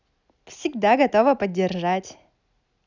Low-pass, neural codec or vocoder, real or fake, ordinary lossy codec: 7.2 kHz; none; real; none